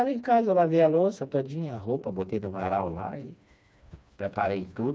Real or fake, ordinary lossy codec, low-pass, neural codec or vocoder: fake; none; none; codec, 16 kHz, 2 kbps, FreqCodec, smaller model